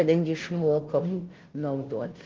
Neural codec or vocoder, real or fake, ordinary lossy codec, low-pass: codec, 16 kHz, 0.5 kbps, FunCodec, trained on Chinese and English, 25 frames a second; fake; Opus, 16 kbps; 7.2 kHz